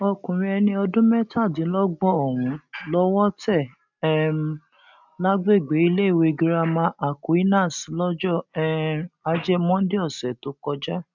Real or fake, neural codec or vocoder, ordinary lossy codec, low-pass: real; none; none; 7.2 kHz